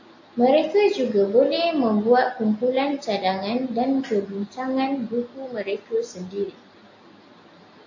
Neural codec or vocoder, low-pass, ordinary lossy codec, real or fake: none; 7.2 kHz; AAC, 48 kbps; real